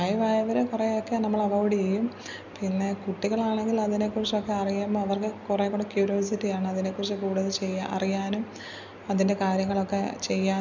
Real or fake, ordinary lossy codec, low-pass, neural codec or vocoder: real; none; 7.2 kHz; none